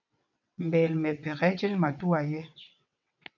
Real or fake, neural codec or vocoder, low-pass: fake; vocoder, 22.05 kHz, 80 mel bands, WaveNeXt; 7.2 kHz